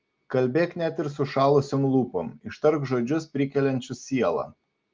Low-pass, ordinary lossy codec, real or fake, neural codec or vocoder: 7.2 kHz; Opus, 32 kbps; real; none